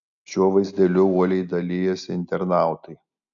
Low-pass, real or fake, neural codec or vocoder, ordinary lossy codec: 7.2 kHz; real; none; MP3, 96 kbps